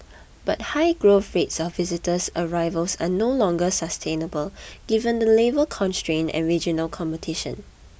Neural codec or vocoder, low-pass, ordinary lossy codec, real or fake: none; none; none; real